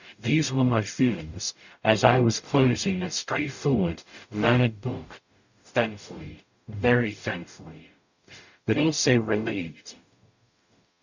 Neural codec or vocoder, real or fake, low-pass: codec, 44.1 kHz, 0.9 kbps, DAC; fake; 7.2 kHz